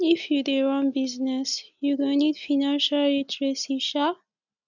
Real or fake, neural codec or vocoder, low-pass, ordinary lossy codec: real; none; 7.2 kHz; none